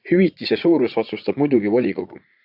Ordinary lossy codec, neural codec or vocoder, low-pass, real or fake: AAC, 48 kbps; vocoder, 44.1 kHz, 80 mel bands, Vocos; 5.4 kHz; fake